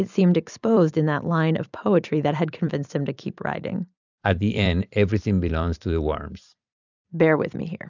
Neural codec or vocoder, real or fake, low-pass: vocoder, 44.1 kHz, 128 mel bands every 256 samples, BigVGAN v2; fake; 7.2 kHz